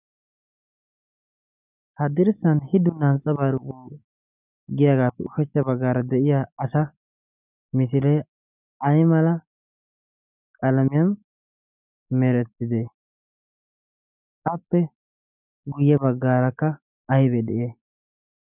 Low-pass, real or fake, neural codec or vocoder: 3.6 kHz; real; none